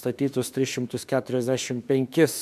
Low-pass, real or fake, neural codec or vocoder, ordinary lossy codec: 14.4 kHz; fake; autoencoder, 48 kHz, 32 numbers a frame, DAC-VAE, trained on Japanese speech; MP3, 96 kbps